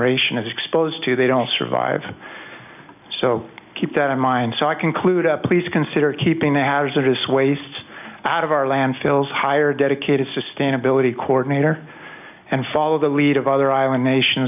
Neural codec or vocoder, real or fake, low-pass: none; real; 3.6 kHz